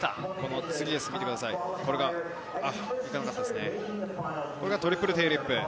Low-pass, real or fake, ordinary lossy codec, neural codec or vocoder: none; real; none; none